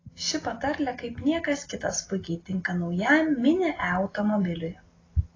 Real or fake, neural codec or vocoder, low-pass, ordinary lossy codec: real; none; 7.2 kHz; AAC, 32 kbps